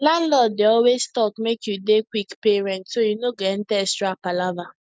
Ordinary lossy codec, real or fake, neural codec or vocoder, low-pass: none; real; none; none